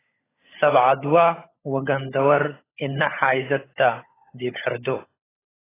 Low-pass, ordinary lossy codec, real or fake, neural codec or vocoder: 3.6 kHz; AAC, 16 kbps; fake; codec, 16 kHz, 16 kbps, FunCodec, trained on LibriTTS, 50 frames a second